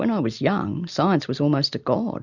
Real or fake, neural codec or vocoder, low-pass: real; none; 7.2 kHz